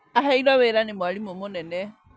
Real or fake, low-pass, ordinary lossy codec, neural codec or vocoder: real; none; none; none